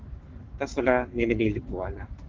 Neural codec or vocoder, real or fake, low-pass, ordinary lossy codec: codec, 44.1 kHz, 7.8 kbps, Pupu-Codec; fake; 7.2 kHz; Opus, 16 kbps